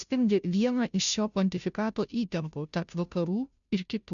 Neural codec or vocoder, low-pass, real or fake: codec, 16 kHz, 0.5 kbps, FunCodec, trained on Chinese and English, 25 frames a second; 7.2 kHz; fake